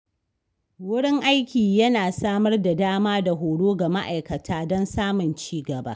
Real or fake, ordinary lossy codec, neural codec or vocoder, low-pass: real; none; none; none